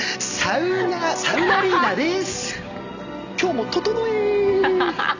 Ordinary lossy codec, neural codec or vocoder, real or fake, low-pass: none; none; real; 7.2 kHz